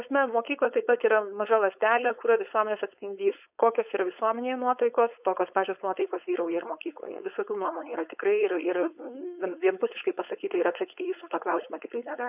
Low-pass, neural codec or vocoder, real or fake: 3.6 kHz; codec, 16 kHz, 4.8 kbps, FACodec; fake